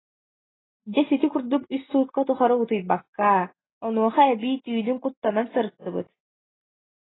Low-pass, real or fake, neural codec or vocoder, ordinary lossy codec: 7.2 kHz; real; none; AAC, 16 kbps